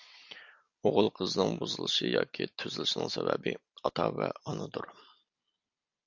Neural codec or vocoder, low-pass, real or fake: none; 7.2 kHz; real